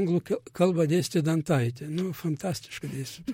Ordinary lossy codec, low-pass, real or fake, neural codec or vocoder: MP3, 64 kbps; 19.8 kHz; fake; vocoder, 44.1 kHz, 128 mel bands, Pupu-Vocoder